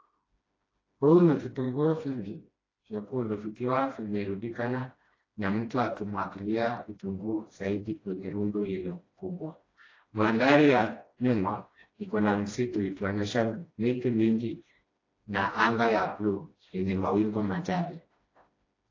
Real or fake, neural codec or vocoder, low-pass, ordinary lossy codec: fake; codec, 16 kHz, 1 kbps, FreqCodec, smaller model; 7.2 kHz; AAC, 32 kbps